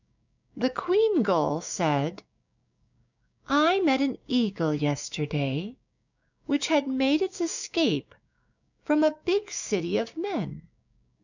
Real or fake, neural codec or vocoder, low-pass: fake; codec, 16 kHz, 6 kbps, DAC; 7.2 kHz